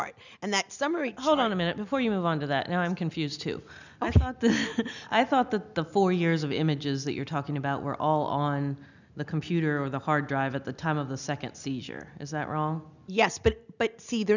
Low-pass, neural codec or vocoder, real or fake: 7.2 kHz; none; real